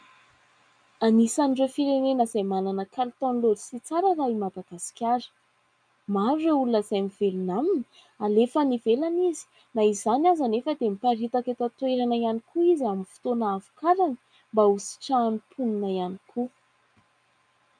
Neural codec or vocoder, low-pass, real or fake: none; 9.9 kHz; real